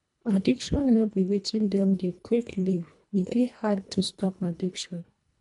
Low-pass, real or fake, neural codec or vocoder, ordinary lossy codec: 10.8 kHz; fake; codec, 24 kHz, 1.5 kbps, HILCodec; MP3, 96 kbps